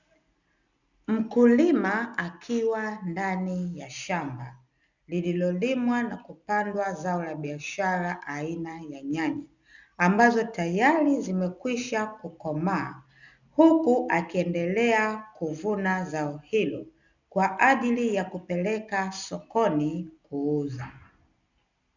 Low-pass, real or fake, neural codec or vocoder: 7.2 kHz; real; none